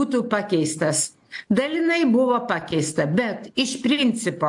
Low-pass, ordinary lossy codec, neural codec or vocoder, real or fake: 10.8 kHz; AAC, 64 kbps; none; real